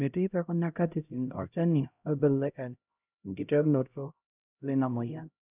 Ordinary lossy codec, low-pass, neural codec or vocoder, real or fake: none; 3.6 kHz; codec, 16 kHz, 0.5 kbps, X-Codec, HuBERT features, trained on LibriSpeech; fake